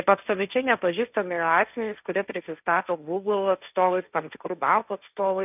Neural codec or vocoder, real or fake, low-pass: codec, 16 kHz, 1.1 kbps, Voila-Tokenizer; fake; 3.6 kHz